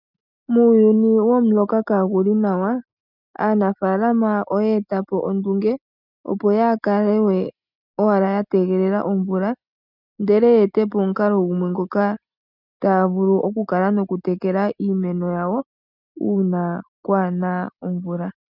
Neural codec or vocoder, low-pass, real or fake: none; 5.4 kHz; real